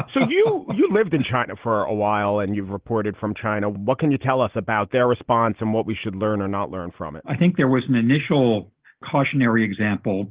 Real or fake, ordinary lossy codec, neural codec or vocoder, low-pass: real; Opus, 16 kbps; none; 3.6 kHz